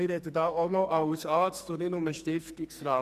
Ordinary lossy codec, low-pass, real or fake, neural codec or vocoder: Opus, 64 kbps; 14.4 kHz; fake; codec, 44.1 kHz, 2.6 kbps, SNAC